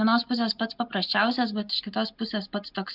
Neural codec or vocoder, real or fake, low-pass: none; real; 5.4 kHz